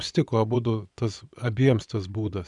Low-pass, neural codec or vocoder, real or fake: 10.8 kHz; vocoder, 24 kHz, 100 mel bands, Vocos; fake